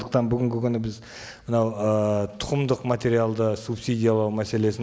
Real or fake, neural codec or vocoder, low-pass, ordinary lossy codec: real; none; none; none